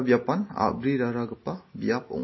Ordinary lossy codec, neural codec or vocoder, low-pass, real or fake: MP3, 24 kbps; none; 7.2 kHz; real